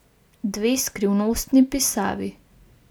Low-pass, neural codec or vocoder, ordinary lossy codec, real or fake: none; none; none; real